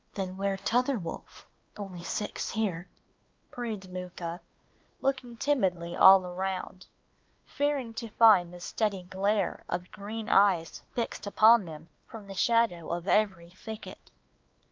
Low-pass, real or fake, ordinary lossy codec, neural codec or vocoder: 7.2 kHz; fake; Opus, 24 kbps; codec, 16 kHz, 2 kbps, X-Codec, WavLM features, trained on Multilingual LibriSpeech